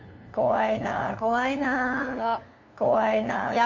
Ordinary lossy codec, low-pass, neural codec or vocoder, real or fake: MP3, 64 kbps; 7.2 kHz; codec, 16 kHz, 2 kbps, FunCodec, trained on LibriTTS, 25 frames a second; fake